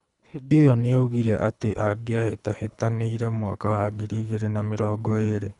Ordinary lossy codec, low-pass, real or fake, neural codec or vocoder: none; 10.8 kHz; fake; codec, 24 kHz, 3 kbps, HILCodec